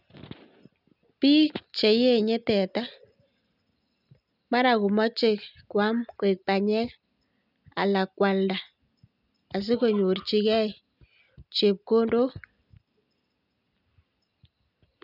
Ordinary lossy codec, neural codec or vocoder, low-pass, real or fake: none; none; 5.4 kHz; real